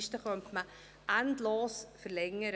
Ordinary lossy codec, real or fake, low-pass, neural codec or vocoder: none; real; none; none